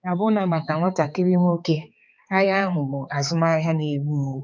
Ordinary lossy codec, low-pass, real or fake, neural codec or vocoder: none; none; fake; codec, 16 kHz, 4 kbps, X-Codec, HuBERT features, trained on balanced general audio